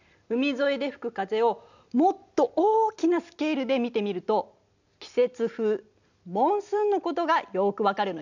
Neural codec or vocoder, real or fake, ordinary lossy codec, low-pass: none; real; none; 7.2 kHz